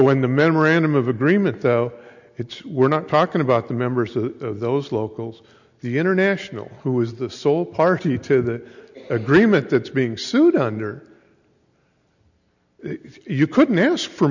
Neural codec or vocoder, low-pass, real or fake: none; 7.2 kHz; real